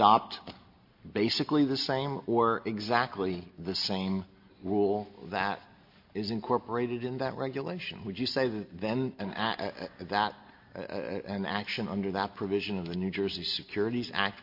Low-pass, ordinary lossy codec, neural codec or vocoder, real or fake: 5.4 kHz; MP3, 32 kbps; none; real